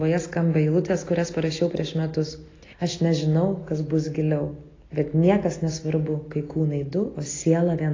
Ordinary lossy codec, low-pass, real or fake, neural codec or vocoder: AAC, 32 kbps; 7.2 kHz; real; none